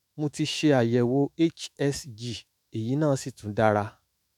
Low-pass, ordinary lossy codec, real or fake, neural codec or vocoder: 19.8 kHz; none; fake; autoencoder, 48 kHz, 128 numbers a frame, DAC-VAE, trained on Japanese speech